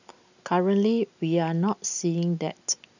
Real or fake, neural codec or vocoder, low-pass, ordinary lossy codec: real; none; 7.2 kHz; none